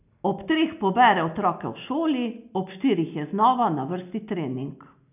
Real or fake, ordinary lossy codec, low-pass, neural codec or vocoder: real; none; 3.6 kHz; none